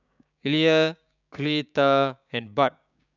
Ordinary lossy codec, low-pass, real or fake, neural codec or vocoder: none; 7.2 kHz; fake; codec, 16 kHz, 6 kbps, DAC